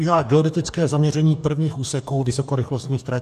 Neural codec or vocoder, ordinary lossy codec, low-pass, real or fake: codec, 44.1 kHz, 2.6 kbps, DAC; AAC, 96 kbps; 14.4 kHz; fake